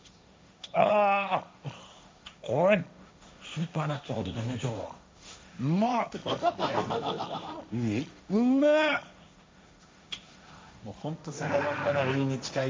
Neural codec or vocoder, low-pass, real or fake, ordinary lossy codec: codec, 16 kHz, 1.1 kbps, Voila-Tokenizer; none; fake; none